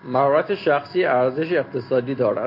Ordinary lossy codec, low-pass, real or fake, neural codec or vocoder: MP3, 32 kbps; 5.4 kHz; real; none